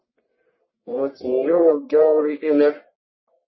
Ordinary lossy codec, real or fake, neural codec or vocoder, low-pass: MP3, 24 kbps; fake; codec, 44.1 kHz, 1.7 kbps, Pupu-Codec; 7.2 kHz